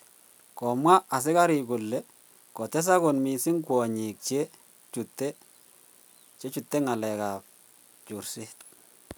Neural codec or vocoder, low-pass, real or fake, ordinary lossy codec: none; none; real; none